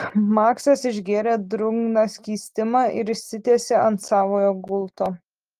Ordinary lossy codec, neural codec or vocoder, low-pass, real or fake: Opus, 24 kbps; none; 14.4 kHz; real